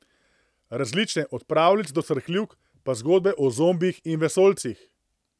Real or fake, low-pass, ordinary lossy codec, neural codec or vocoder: real; none; none; none